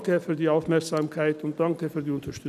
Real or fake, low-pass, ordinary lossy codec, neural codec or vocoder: real; 14.4 kHz; none; none